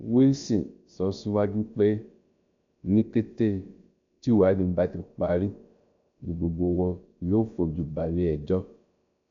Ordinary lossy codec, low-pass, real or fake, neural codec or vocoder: MP3, 64 kbps; 7.2 kHz; fake; codec, 16 kHz, about 1 kbps, DyCAST, with the encoder's durations